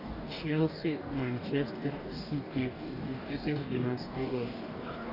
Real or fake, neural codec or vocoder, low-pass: fake; codec, 44.1 kHz, 2.6 kbps, DAC; 5.4 kHz